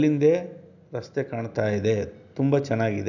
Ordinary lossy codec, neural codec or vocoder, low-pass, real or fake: none; none; 7.2 kHz; real